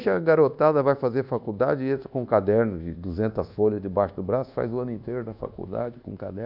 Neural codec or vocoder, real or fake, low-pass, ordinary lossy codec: codec, 24 kHz, 1.2 kbps, DualCodec; fake; 5.4 kHz; none